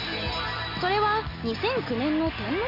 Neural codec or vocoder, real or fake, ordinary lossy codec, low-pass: none; real; none; 5.4 kHz